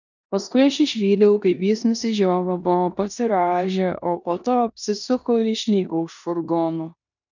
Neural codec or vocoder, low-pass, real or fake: codec, 16 kHz in and 24 kHz out, 0.9 kbps, LongCat-Audio-Codec, four codebook decoder; 7.2 kHz; fake